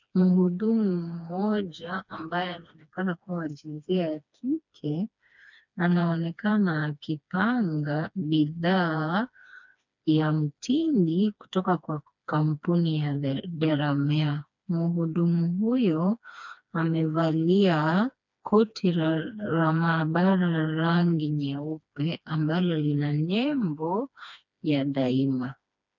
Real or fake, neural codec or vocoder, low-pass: fake; codec, 16 kHz, 2 kbps, FreqCodec, smaller model; 7.2 kHz